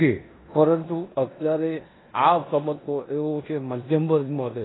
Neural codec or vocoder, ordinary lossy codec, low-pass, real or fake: codec, 16 kHz in and 24 kHz out, 0.9 kbps, LongCat-Audio-Codec, four codebook decoder; AAC, 16 kbps; 7.2 kHz; fake